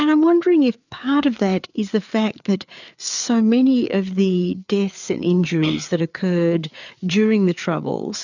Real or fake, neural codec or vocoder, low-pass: fake; codec, 16 kHz, 4 kbps, FreqCodec, larger model; 7.2 kHz